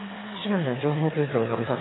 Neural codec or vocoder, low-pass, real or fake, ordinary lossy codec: autoencoder, 22.05 kHz, a latent of 192 numbers a frame, VITS, trained on one speaker; 7.2 kHz; fake; AAC, 16 kbps